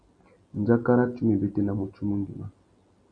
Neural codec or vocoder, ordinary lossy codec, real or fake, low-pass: none; MP3, 64 kbps; real; 9.9 kHz